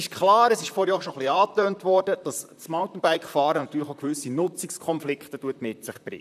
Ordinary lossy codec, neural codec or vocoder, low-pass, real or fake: none; vocoder, 44.1 kHz, 128 mel bands, Pupu-Vocoder; 14.4 kHz; fake